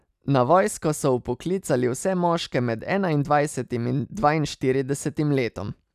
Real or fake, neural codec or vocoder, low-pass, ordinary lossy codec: real; none; 14.4 kHz; none